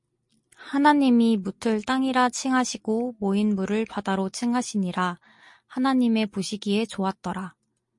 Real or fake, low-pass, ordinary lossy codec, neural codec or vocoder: real; 10.8 kHz; MP3, 48 kbps; none